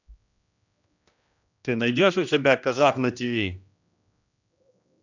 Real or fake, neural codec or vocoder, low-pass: fake; codec, 16 kHz, 1 kbps, X-Codec, HuBERT features, trained on general audio; 7.2 kHz